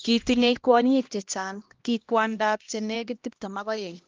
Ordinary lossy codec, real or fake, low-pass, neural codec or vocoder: Opus, 32 kbps; fake; 7.2 kHz; codec, 16 kHz, 1 kbps, X-Codec, HuBERT features, trained on LibriSpeech